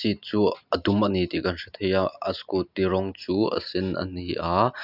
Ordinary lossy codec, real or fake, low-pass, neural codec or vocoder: none; real; 5.4 kHz; none